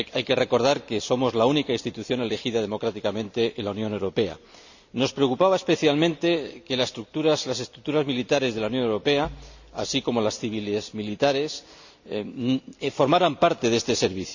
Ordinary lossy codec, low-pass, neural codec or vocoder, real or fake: none; 7.2 kHz; none; real